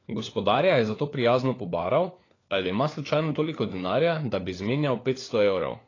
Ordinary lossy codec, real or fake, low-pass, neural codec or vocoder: AAC, 32 kbps; fake; 7.2 kHz; codec, 16 kHz, 4 kbps, FunCodec, trained on LibriTTS, 50 frames a second